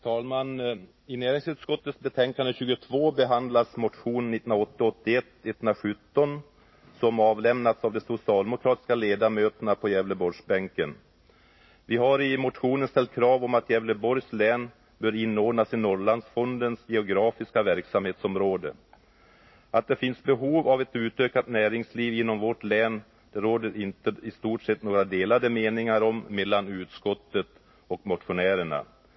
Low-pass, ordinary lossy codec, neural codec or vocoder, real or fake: 7.2 kHz; MP3, 24 kbps; none; real